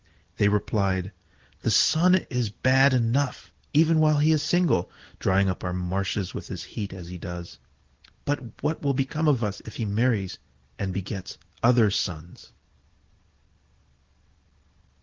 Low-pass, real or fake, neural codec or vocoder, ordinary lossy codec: 7.2 kHz; real; none; Opus, 16 kbps